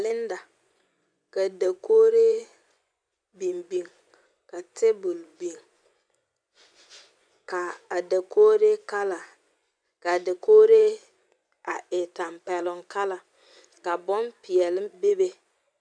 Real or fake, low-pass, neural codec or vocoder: fake; 9.9 kHz; vocoder, 24 kHz, 100 mel bands, Vocos